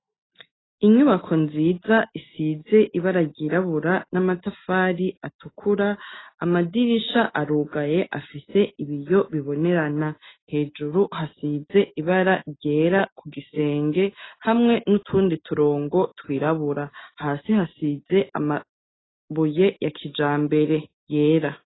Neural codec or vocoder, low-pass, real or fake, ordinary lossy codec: none; 7.2 kHz; real; AAC, 16 kbps